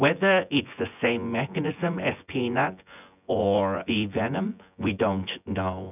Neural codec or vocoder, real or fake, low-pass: vocoder, 24 kHz, 100 mel bands, Vocos; fake; 3.6 kHz